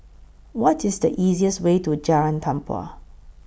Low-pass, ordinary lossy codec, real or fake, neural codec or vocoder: none; none; real; none